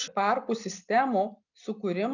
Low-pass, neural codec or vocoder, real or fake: 7.2 kHz; none; real